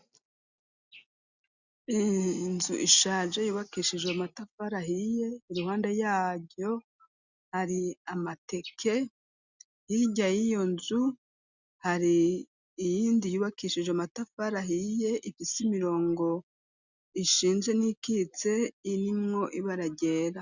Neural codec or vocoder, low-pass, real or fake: none; 7.2 kHz; real